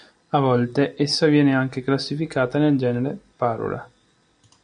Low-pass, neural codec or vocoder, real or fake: 9.9 kHz; none; real